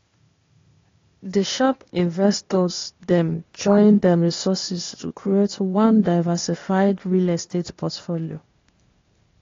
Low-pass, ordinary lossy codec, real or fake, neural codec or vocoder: 7.2 kHz; AAC, 32 kbps; fake; codec, 16 kHz, 0.8 kbps, ZipCodec